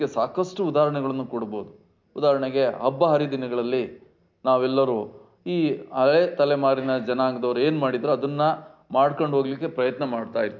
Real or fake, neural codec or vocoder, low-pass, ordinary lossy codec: real; none; 7.2 kHz; MP3, 64 kbps